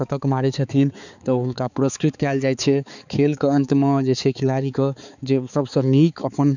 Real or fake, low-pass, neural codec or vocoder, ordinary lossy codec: fake; 7.2 kHz; codec, 16 kHz, 4 kbps, X-Codec, HuBERT features, trained on balanced general audio; none